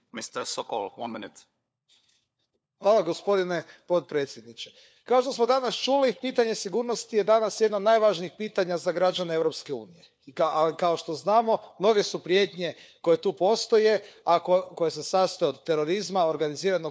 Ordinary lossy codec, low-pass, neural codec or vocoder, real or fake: none; none; codec, 16 kHz, 4 kbps, FunCodec, trained on LibriTTS, 50 frames a second; fake